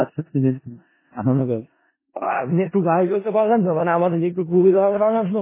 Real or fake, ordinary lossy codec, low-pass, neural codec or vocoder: fake; MP3, 16 kbps; 3.6 kHz; codec, 16 kHz in and 24 kHz out, 0.4 kbps, LongCat-Audio-Codec, four codebook decoder